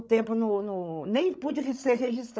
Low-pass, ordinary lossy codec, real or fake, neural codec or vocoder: none; none; fake; codec, 16 kHz, 16 kbps, FreqCodec, larger model